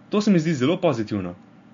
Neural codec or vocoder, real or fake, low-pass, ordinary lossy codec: none; real; 7.2 kHz; MP3, 48 kbps